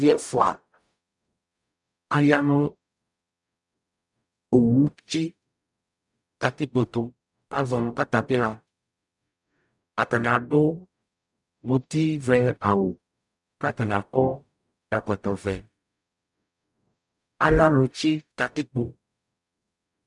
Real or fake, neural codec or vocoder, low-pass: fake; codec, 44.1 kHz, 0.9 kbps, DAC; 10.8 kHz